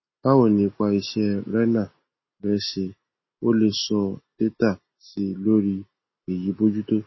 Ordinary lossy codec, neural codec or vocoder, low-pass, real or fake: MP3, 24 kbps; none; 7.2 kHz; real